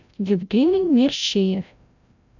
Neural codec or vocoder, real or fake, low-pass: codec, 16 kHz, 0.5 kbps, FreqCodec, larger model; fake; 7.2 kHz